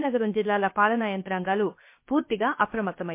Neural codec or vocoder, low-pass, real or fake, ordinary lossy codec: codec, 16 kHz, 0.7 kbps, FocalCodec; 3.6 kHz; fake; MP3, 24 kbps